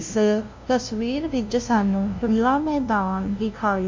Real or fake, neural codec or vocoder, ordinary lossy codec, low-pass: fake; codec, 16 kHz, 0.5 kbps, FunCodec, trained on LibriTTS, 25 frames a second; MP3, 64 kbps; 7.2 kHz